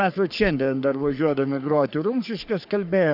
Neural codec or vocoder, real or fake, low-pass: codec, 44.1 kHz, 3.4 kbps, Pupu-Codec; fake; 5.4 kHz